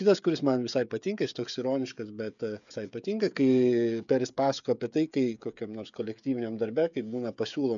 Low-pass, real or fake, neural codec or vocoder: 7.2 kHz; fake; codec, 16 kHz, 16 kbps, FreqCodec, smaller model